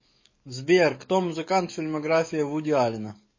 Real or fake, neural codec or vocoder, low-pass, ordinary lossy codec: fake; codec, 44.1 kHz, 7.8 kbps, DAC; 7.2 kHz; MP3, 32 kbps